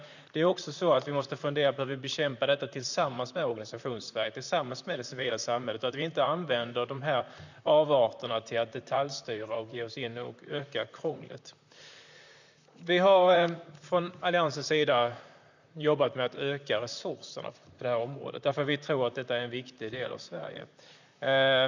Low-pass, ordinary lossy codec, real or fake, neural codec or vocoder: 7.2 kHz; none; fake; vocoder, 44.1 kHz, 128 mel bands, Pupu-Vocoder